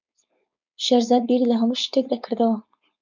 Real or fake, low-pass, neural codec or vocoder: fake; 7.2 kHz; codec, 16 kHz, 4.8 kbps, FACodec